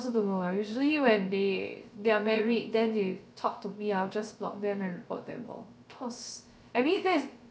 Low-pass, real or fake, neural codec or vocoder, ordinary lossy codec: none; fake; codec, 16 kHz, 0.3 kbps, FocalCodec; none